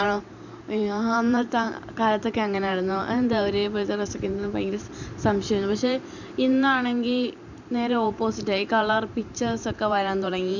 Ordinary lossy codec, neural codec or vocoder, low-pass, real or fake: none; vocoder, 44.1 kHz, 128 mel bands every 512 samples, BigVGAN v2; 7.2 kHz; fake